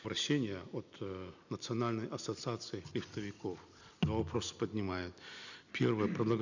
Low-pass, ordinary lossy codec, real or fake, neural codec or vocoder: 7.2 kHz; none; real; none